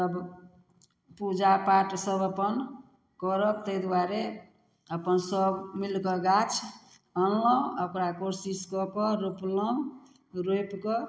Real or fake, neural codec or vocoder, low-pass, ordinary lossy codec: real; none; none; none